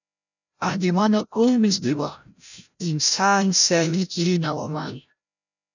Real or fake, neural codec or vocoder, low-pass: fake; codec, 16 kHz, 0.5 kbps, FreqCodec, larger model; 7.2 kHz